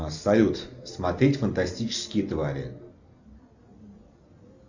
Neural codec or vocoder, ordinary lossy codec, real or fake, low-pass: none; Opus, 64 kbps; real; 7.2 kHz